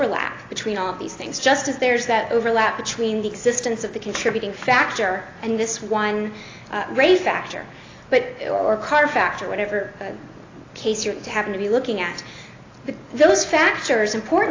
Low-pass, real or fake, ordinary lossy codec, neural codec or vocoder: 7.2 kHz; real; AAC, 32 kbps; none